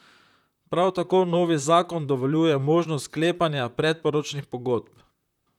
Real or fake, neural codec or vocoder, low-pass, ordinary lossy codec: fake; vocoder, 44.1 kHz, 128 mel bands, Pupu-Vocoder; 19.8 kHz; none